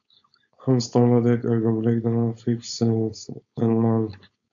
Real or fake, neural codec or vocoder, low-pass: fake; codec, 16 kHz, 4.8 kbps, FACodec; 7.2 kHz